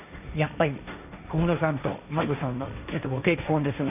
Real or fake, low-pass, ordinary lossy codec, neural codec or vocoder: fake; 3.6 kHz; none; codec, 16 kHz, 1.1 kbps, Voila-Tokenizer